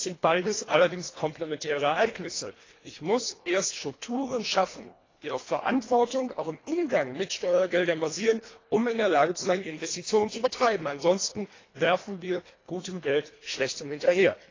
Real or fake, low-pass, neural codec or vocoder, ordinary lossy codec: fake; 7.2 kHz; codec, 24 kHz, 1.5 kbps, HILCodec; AAC, 32 kbps